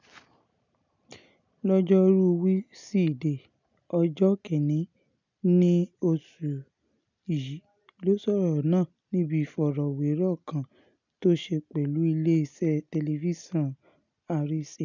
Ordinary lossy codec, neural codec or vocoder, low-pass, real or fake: none; none; 7.2 kHz; real